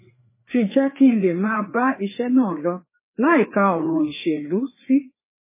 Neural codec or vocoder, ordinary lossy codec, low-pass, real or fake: codec, 16 kHz, 2 kbps, FreqCodec, larger model; MP3, 16 kbps; 3.6 kHz; fake